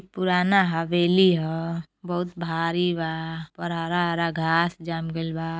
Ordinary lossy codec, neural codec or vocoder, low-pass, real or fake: none; none; none; real